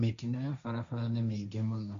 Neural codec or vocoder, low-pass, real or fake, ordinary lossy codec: codec, 16 kHz, 1.1 kbps, Voila-Tokenizer; 7.2 kHz; fake; Opus, 64 kbps